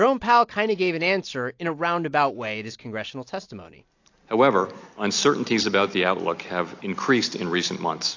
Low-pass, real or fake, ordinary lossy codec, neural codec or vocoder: 7.2 kHz; real; AAC, 48 kbps; none